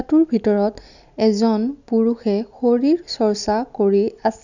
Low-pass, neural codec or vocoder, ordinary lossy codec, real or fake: 7.2 kHz; none; none; real